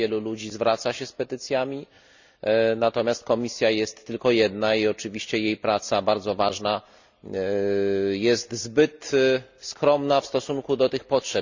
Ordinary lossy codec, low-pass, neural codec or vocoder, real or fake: Opus, 64 kbps; 7.2 kHz; none; real